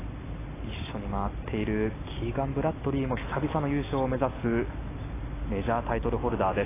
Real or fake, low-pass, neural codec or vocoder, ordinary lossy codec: real; 3.6 kHz; none; AAC, 16 kbps